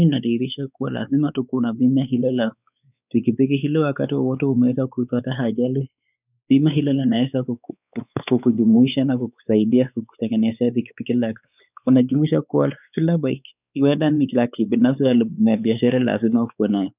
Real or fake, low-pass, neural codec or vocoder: fake; 3.6 kHz; codec, 16 kHz, 4 kbps, X-Codec, WavLM features, trained on Multilingual LibriSpeech